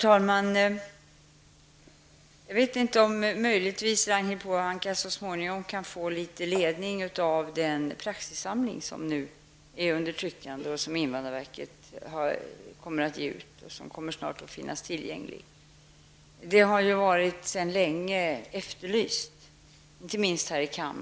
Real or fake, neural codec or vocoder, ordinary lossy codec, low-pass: real; none; none; none